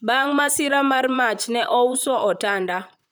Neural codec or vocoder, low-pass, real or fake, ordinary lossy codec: vocoder, 44.1 kHz, 128 mel bands, Pupu-Vocoder; none; fake; none